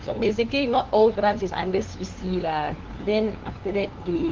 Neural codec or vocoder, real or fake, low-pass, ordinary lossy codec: codec, 16 kHz, 2 kbps, FunCodec, trained on LibriTTS, 25 frames a second; fake; 7.2 kHz; Opus, 16 kbps